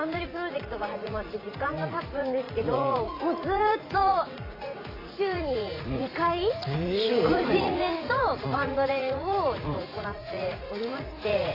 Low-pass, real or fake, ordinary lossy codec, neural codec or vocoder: 5.4 kHz; fake; AAC, 24 kbps; vocoder, 44.1 kHz, 80 mel bands, Vocos